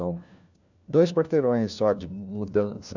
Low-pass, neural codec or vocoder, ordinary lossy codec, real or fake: 7.2 kHz; codec, 16 kHz, 1 kbps, FunCodec, trained on LibriTTS, 50 frames a second; none; fake